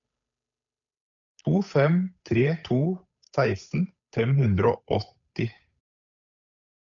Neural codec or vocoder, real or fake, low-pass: codec, 16 kHz, 8 kbps, FunCodec, trained on Chinese and English, 25 frames a second; fake; 7.2 kHz